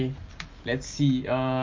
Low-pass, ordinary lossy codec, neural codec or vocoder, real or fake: 7.2 kHz; Opus, 24 kbps; none; real